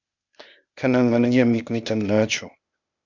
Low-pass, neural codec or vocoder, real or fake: 7.2 kHz; codec, 16 kHz, 0.8 kbps, ZipCodec; fake